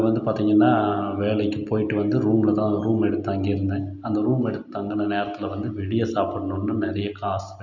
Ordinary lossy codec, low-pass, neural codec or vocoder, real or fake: none; 7.2 kHz; none; real